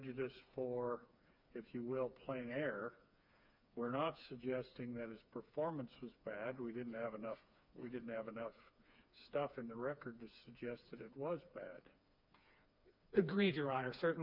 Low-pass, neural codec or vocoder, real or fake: 5.4 kHz; codec, 16 kHz, 4 kbps, FreqCodec, smaller model; fake